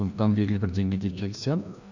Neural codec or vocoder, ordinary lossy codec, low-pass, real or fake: codec, 16 kHz, 1 kbps, FreqCodec, larger model; none; 7.2 kHz; fake